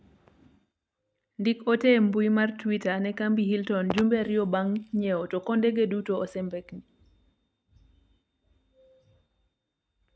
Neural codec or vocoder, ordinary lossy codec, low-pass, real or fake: none; none; none; real